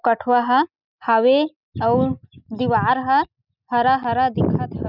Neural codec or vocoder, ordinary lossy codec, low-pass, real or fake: none; none; 5.4 kHz; real